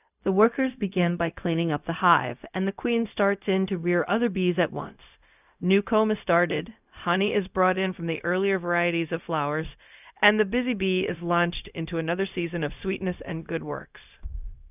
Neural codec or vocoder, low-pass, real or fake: codec, 16 kHz, 0.4 kbps, LongCat-Audio-Codec; 3.6 kHz; fake